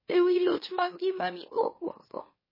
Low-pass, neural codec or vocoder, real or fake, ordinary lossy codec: 5.4 kHz; autoencoder, 44.1 kHz, a latent of 192 numbers a frame, MeloTTS; fake; MP3, 24 kbps